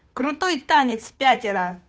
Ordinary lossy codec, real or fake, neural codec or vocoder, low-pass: none; fake; codec, 16 kHz, 2 kbps, FunCodec, trained on Chinese and English, 25 frames a second; none